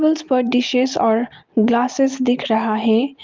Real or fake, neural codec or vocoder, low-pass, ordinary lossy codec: real; none; 7.2 kHz; Opus, 24 kbps